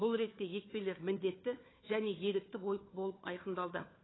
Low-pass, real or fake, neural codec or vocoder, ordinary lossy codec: 7.2 kHz; fake; codec, 16 kHz, 4 kbps, FunCodec, trained on Chinese and English, 50 frames a second; AAC, 16 kbps